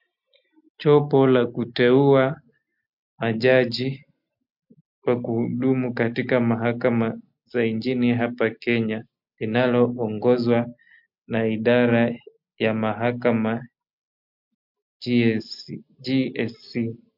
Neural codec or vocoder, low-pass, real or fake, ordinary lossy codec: none; 5.4 kHz; real; MP3, 48 kbps